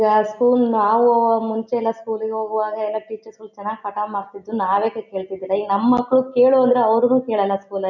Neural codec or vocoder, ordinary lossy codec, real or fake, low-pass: none; none; real; 7.2 kHz